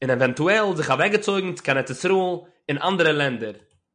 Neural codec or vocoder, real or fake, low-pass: none; real; 9.9 kHz